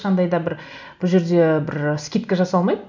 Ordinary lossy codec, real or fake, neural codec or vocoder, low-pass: none; real; none; 7.2 kHz